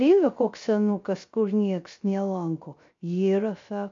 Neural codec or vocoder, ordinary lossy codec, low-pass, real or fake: codec, 16 kHz, 0.3 kbps, FocalCodec; MP3, 48 kbps; 7.2 kHz; fake